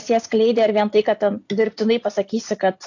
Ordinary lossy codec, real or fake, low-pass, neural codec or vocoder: AAC, 48 kbps; real; 7.2 kHz; none